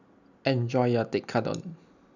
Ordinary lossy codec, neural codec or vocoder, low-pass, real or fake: none; none; 7.2 kHz; real